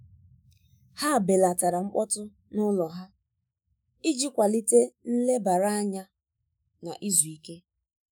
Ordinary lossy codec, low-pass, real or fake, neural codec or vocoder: none; none; fake; autoencoder, 48 kHz, 128 numbers a frame, DAC-VAE, trained on Japanese speech